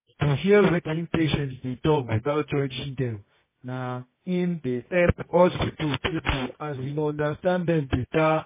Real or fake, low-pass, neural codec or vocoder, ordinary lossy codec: fake; 3.6 kHz; codec, 24 kHz, 0.9 kbps, WavTokenizer, medium music audio release; MP3, 16 kbps